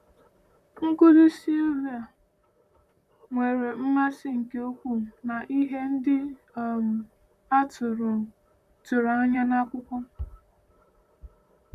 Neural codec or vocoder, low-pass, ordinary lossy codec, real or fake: vocoder, 44.1 kHz, 128 mel bands, Pupu-Vocoder; 14.4 kHz; none; fake